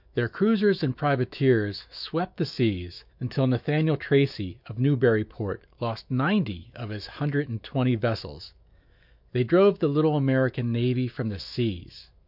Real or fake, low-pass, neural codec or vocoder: fake; 5.4 kHz; autoencoder, 48 kHz, 128 numbers a frame, DAC-VAE, trained on Japanese speech